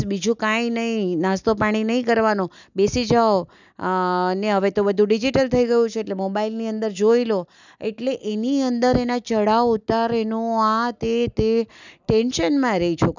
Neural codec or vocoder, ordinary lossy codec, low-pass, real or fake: none; none; 7.2 kHz; real